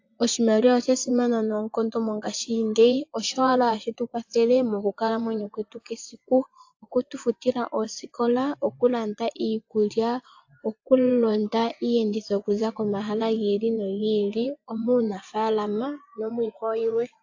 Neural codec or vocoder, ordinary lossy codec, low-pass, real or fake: vocoder, 24 kHz, 100 mel bands, Vocos; AAC, 48 kbps; 7.2 kHz; fake